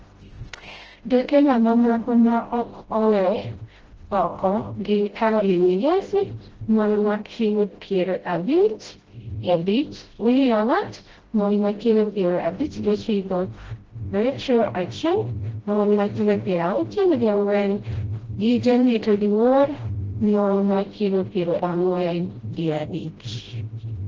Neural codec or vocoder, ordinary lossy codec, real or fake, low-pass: codec, 16 kHz, 0.5 kbps, FreqCodec, smaller model; Opus, 16 kbps; fake; 7.2 kHz